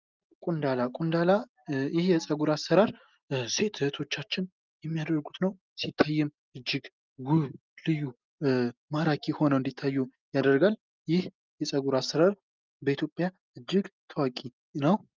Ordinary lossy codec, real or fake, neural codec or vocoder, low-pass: Opus, 24 kbps; real; none; 7.2 kHz